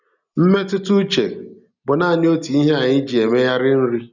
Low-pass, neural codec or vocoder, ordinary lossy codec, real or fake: 7.2 kHz; none; none; real